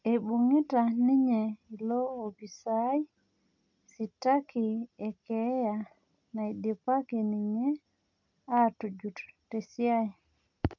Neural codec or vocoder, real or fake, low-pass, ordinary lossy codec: none; real; 7.2 kHz; none